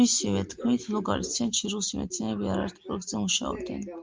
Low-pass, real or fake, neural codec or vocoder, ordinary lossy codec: 7.2 kHz; real; none; Opus, 32 kbps